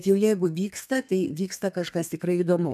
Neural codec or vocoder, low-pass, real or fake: codec, 32 kHz, 1.9 kbps, SNAC; 14.4 kHz; fake